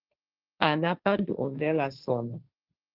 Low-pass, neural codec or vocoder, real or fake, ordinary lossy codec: 5.4 kHz; codec, 16 kHz, 1.1 kbps, Voila-Tokenizer; fake; Opus, 24 kbps